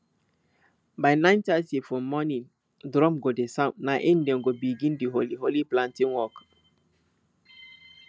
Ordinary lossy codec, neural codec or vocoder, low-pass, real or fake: none; none; none; real